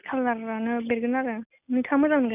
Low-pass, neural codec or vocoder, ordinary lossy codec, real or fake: 3.6 kHz; none; none; real